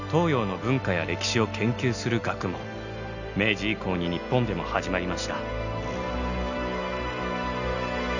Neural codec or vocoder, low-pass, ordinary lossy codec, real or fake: none; 7.2 kHz; none; real